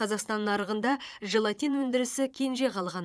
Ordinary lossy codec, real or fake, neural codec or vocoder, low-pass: none; fake; vocoder, 22.05 kHz, 80 mel bands, Vocos; none